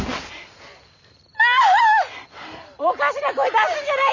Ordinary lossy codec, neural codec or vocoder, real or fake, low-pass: none; none; real; 7.2 kHz